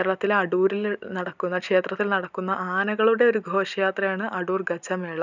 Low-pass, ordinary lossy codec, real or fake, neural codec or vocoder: 7.2 kHz; none; real; none